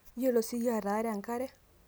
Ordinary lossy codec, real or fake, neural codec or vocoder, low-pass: none; real; none; none